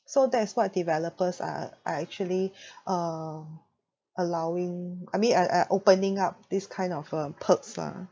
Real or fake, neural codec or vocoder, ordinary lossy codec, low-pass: real; none; none; none